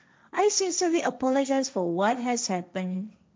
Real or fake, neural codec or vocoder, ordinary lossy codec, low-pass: fake; codec, 16 kHz, 1.1 kbps, Voila-Tokenizer; none; none